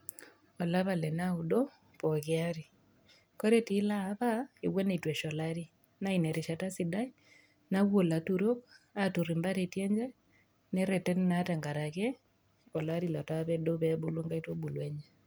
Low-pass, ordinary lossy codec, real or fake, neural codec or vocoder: none; none; real; none